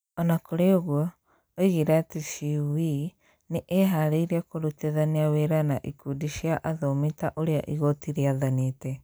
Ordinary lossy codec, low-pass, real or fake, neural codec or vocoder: none; none; real; none